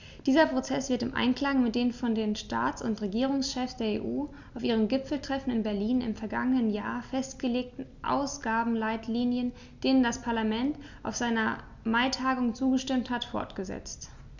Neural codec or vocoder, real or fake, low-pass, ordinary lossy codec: none; real; 7.2 kHz; Opus, 64 kbps